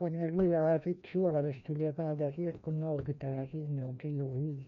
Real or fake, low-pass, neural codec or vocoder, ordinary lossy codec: fake; 7.2 kHz; codec, 16 kHz, 1 kbps, FreqCodec, larger model; none